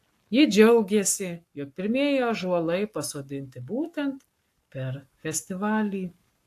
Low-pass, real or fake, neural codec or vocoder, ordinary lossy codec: 14.4 kHz; fake; codec, 44.1 kHz, 7.8 kbps, Pupu-Codec; AAC, 64 kbps